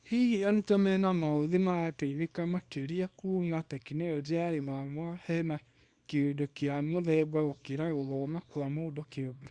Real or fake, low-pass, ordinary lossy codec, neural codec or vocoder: fake; 9.9 kHz; Opus, 64 kbps; codec, 24 kHz, 0.9 kbps, WavTokenizer, small release